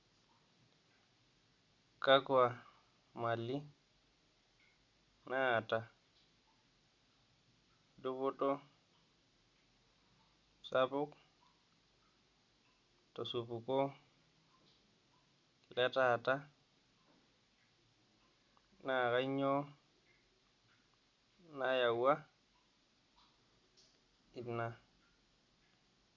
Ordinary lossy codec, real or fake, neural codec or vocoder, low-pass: Opus, 64 kbps; real; none; 7.2 kHz